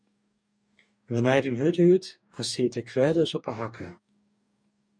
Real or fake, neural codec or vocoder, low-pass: fake; codec, 44.1 kHz, 2.6 kbps, DAC; 9.9 kHz